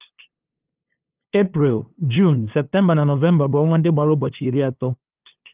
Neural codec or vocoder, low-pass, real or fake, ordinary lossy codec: codec, 16 kHz, 2 kbps, FunCodec, trained on LibriTTS, 25 frames a second; 3.6 kHz; fake; Opus, 32 kbps